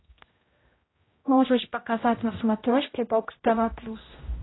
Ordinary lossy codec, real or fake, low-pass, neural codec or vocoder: AAC, 16 kbps; fake; 7.2 kHz; codec, 16 kHz, 0.5 kbps, X-Codec, HuBERT features, trained on general audio